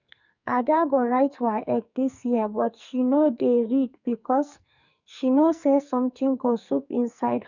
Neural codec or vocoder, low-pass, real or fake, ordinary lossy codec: codec, 44.1 kHz, 2.6 kbps, SNAC; 7.2 kHz; fake; none